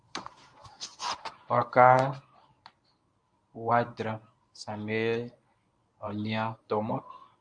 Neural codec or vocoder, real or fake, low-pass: codec, 24 kHz, 0.9 kbps, WavTokenizer, medium speech release version 1; fake; 9.9 kHz